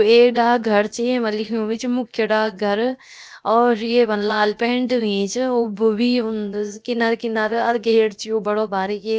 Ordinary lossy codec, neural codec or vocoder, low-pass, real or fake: none; codec, 16 kHz, 0.7 kbps, FocalCodec; none; fake